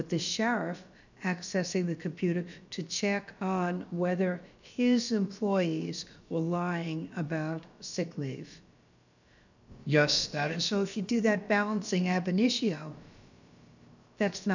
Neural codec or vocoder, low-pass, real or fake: codec, 16 kHz, about 1 kbps, DyCAST, with the encoder's durations; 7.2 kHz; fake